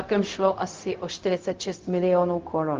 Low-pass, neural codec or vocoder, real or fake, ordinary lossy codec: 7.2 kHz; codec, 16 kHz, 0.4 kbps, LongCat-Audio-Codec; fake; Opus, 32 kbps